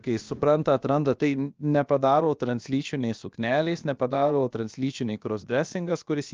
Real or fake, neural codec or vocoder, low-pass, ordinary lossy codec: fake; codec, 16 kHz, about 1 kbps, DyCAST, with the encoder's durations; 7.2 kHz; Opus, 24 kbps